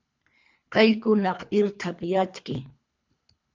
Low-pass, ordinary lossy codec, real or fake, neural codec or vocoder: 7.2 kHz; MP3, 64 kbps; fake; codec, 24 kHz, 3 kbps, HILCodec